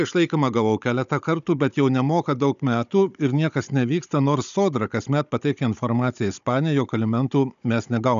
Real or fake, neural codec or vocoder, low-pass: fake; codec, 16 kHz, 16 kbps, FunCodec, trained on Chinese and English, 50 frames a second; 7.2 kHz